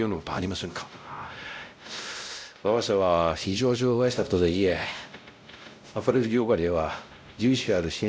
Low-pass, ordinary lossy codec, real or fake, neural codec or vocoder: none; none; fake; codec, 16 kHz, 0.5 kbps, X-Codec, WavLM features, trained on Multilingual LibriSpeech